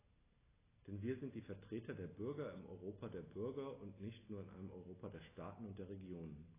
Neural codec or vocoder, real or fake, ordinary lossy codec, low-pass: none; real; AAC, 16 kbps; 3.6 kHz